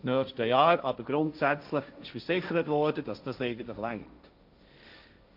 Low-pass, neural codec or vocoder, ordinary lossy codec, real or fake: 5.4 kHz; codec, 16 kHz, 1.1 kbps, Voila-Tokenizer; none; fake